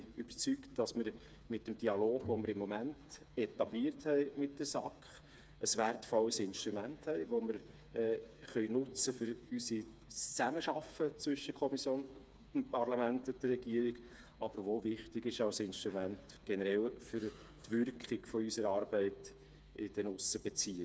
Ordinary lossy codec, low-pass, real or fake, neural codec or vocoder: none; none; fake; codec, 16 kHz, 4 kbps, FreqCodec, smaller model